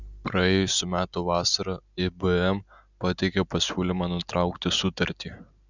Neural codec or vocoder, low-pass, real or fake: none; 7.2 kHz; real